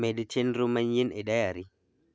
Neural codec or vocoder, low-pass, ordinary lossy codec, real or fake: none; none; none; real